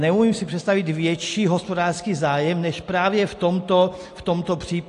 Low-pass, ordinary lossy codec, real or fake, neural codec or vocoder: 10.8 kHz; AAC, 48 kbps; real; none